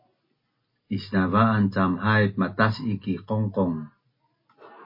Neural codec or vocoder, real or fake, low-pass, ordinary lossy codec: none; real; 5.4 kHz; MP3, 24 kbps